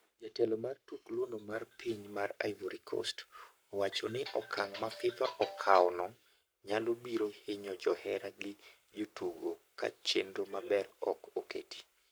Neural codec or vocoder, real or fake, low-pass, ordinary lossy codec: codec, 44.1 kHz, 7.8 kbps, Pupu-Codec; fake; none; none